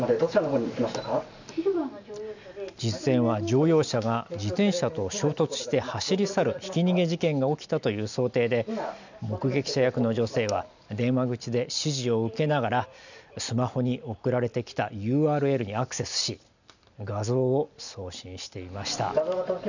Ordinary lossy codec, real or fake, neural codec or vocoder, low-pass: none; real; none; 7.2 kHz